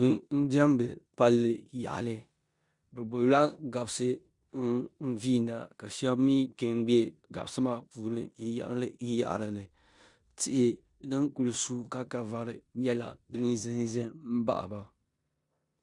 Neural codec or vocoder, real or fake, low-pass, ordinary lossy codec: codec, 16 kHz in and 24 kHz out, 0.9 kbps, LongCat-Audio-Codec, four codebook decoder; fake; 10.8 kHz; Opus, 64 kbps